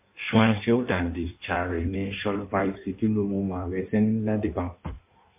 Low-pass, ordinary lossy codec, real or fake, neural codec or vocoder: 3.6 kHz; MP3, 32 kbps; fake; codec, 16 kHz in and 24 kHz out, 1.1 kbps, FireRedTTS-2 codec